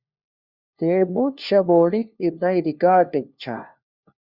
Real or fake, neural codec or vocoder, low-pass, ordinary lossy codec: fake; codec, 16 kHz, 1 kbps, FunCodec, trained on LibriTTS, 50 frames a second; 5.4 kHz; Opus, 64 kbps